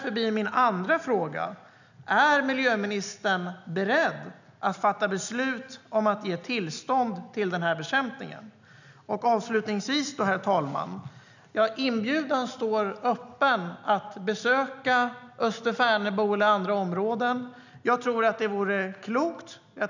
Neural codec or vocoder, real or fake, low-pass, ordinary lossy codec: none; real; 7.2 kHz; none